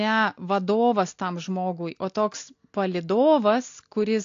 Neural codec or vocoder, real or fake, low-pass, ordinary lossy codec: none; real; 7.2 kHz; AAC, 48 kbps